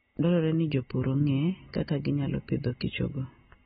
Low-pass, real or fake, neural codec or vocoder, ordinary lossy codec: 19.8 kHz; real; none; AAC, 16 kbps